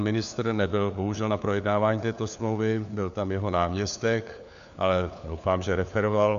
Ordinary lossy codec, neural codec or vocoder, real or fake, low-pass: AAC, 96 kbps; codec, 16 kHz, 4 kbps, FunCodec, trained on LibriTTS, 50 frames a second; fake; 7.2 kHz